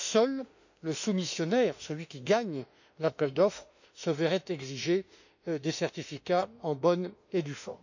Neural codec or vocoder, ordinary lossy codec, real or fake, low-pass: autoencoder, 48 kHz, 32 numbers a frame, DAC-VAE, trained on Japanese speech; none; fake; 7.2 kHz